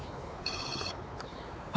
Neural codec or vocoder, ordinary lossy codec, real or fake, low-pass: codec, 16 kHz, 4 kbps, X-Codec, WavLM features, trained on Multilingual LibriSpeech; none; fake; none